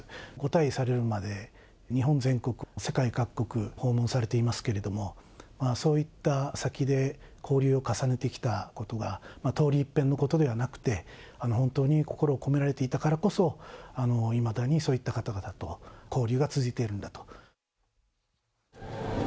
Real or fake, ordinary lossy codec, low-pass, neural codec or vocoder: real; none; none; none